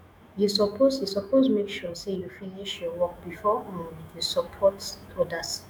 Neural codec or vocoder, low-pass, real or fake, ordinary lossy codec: autoencoder, 48 kHz, 128 numbers a frame, DAC-VAE, trained on Japanese speech; none; fake; none